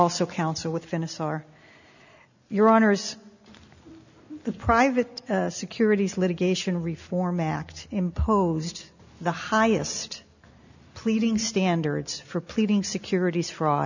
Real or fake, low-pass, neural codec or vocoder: real; 7.2 kHz; none